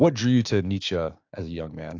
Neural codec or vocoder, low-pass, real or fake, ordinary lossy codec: codec, 16 kHz, 6 kbps, DAC; 7.2 kHz; fake; AAC, 48 kbps